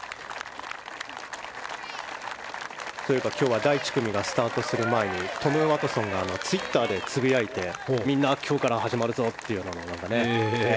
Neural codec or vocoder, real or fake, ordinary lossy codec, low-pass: none; real; none; none